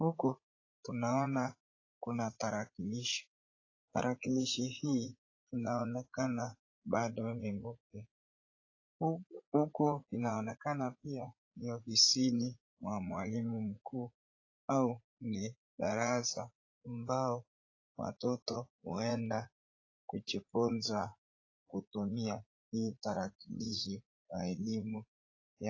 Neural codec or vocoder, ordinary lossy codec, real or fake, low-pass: vocoder, 44.1 kHz, 80 mel bands, Vocos; AAC, 32 kbps; fake; 7.2 kHz